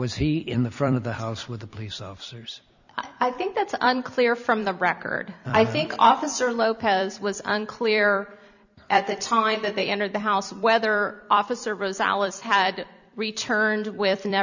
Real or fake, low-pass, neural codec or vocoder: fake; 7.2 kHz; vocoder, 44.1 kHz, 128 mel bands every 256 samples, BigVGAN v2